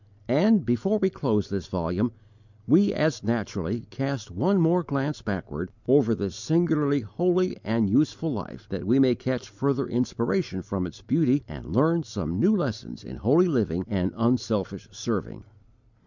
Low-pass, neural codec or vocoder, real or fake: 7.2 kHz; none; real